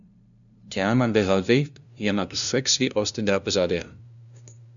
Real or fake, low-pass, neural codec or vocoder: fake; 7.2 kHz; codec, 16 kHz, 0.5 kbps, FunCodec, trained on LibriTTS, 25 frames a second